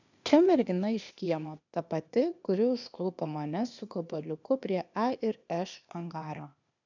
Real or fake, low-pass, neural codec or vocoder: fake; 7.2 kHz; codec, 16 kHz, 0.8 kbps, ZipCodec